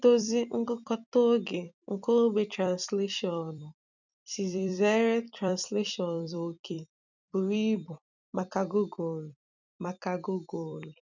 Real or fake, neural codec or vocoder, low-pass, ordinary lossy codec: fake; vocoder, 44.1 kHz, 128 mel bands every 256 samples, BigVGAN v2; 7.2 kHz; none